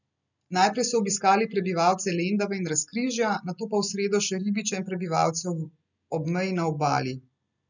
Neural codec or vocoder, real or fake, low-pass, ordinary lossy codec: none; real; 7.2 kHz; none